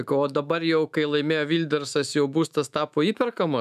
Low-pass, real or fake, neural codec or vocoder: 14.4 kHz; fake; autoencoder, 48 kHz, 128 numbers a frame, DAC-VAE, trained on Japanese speech